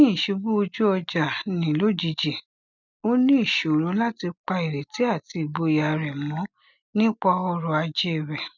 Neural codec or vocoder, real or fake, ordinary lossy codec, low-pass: none; real; none; 7.2 kHz